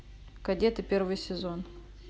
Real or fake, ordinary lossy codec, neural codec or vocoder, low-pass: real; none; none; none